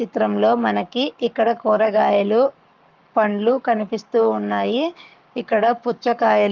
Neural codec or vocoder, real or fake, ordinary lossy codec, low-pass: codec, 44.1 kHz, 7.8 kbps, Pupu-Codec; fake; Opus, 32 kbps; 7.2 kHz